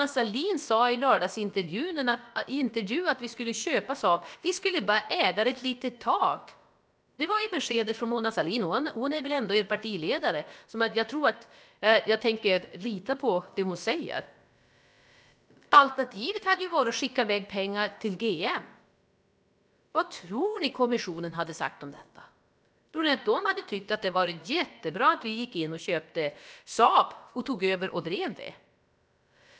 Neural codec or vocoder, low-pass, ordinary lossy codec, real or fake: codec, 16 kHz, about 1 kbps, DyCAST, with the encoder's durations; none; none; fake